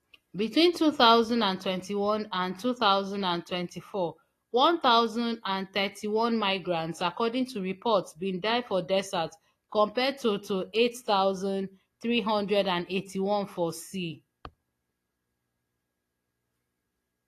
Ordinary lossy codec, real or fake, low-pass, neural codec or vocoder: AAC, 48 kbps; real; 14.4 kHz; none